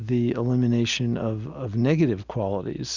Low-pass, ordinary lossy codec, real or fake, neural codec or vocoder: 7.2 kHz; Opus, 64 kbps; real; none